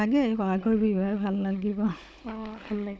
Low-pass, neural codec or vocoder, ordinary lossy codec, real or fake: none; codec, 16 kHz, 16 kbps, FunCodec, trained on Chinese and English, 50 frames a second; none; fake